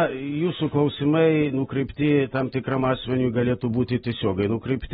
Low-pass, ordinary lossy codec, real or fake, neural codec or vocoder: 19.8 kHz; AAC, 16 kbps; real; none